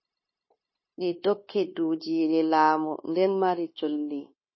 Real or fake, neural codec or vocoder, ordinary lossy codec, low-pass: fake; codec, 16 kHz, 0.9 kbps, LongCat-Audio-Codec; MP3, 24 kbps; 7.2 kHz